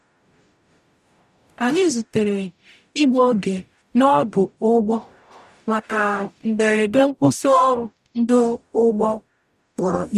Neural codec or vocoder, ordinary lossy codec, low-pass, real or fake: codec, 44.1 kHz, 0.9 kbps, DAC; none; 14.4 kHz; fake